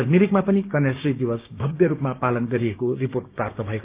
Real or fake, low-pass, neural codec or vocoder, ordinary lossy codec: fake; 3.6 kHz; codec, 16 kHz, 6 kbps, DAC; Opus, 24 kbps